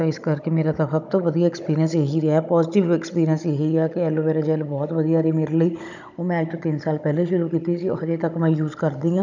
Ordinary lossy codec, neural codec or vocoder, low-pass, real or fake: none; codec, 16 kHz, 16 kbps, FunCodec, trained on Chinese and English, 50 frames a second; 7.2 kHz; fake